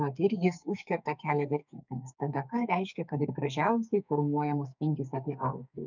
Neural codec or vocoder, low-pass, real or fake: codec, 44.1 kHz, 2.6 kbps, SNAC; 7.2 kHz; fake